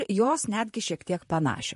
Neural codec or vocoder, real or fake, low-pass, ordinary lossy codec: vocoder, 44.1 kHz, 128 mel bands, Pupu-Vocoder; fake; 14.4 kHz; MP3, 48 kbps